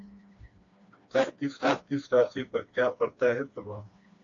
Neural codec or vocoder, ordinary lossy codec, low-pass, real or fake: codec, 16 kHz, 2 kbps, FreqCodec, smaller model; AAC, 48 kbps; 7.2 kHz; fake